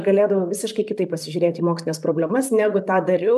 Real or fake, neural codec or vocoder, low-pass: fake; vocoder, 44.1 kHz, 128 mel bands, Pupu-Vocoder; 14.4 kHz